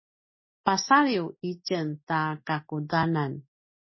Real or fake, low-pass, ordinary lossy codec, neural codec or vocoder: real; 7.2 kHz; MP3, 24 kbps; none